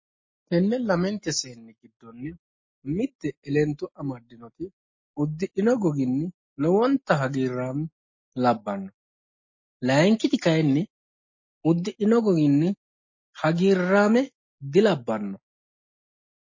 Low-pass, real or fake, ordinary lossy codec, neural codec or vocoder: 7.2 kHz; real; MP3, 32 kbps; none